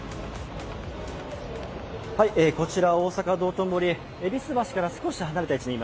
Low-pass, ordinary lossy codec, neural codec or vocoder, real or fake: none; none; none; real